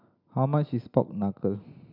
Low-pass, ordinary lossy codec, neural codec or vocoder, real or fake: 5.4 kHz; none; none; real